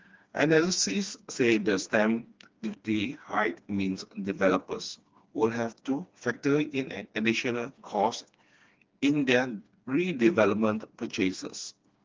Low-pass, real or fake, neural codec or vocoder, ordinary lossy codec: 7.2 kHz; fake; codec, 16 kHz, 2 kbps, FreqCodec, smaller model; Opus, 32 kbps